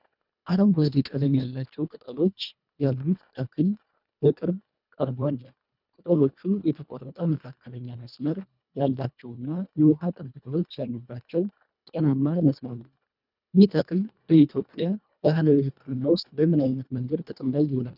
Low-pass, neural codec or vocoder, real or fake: 5.4 kHz; codec, 24 kHz, 1.5 kbps, HILCodec; fake